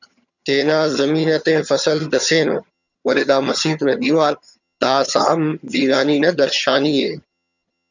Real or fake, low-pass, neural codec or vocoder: fake; 7.2 kHz; vocoder, 22.05 kHz, 80 mel bands, HiFi-GAN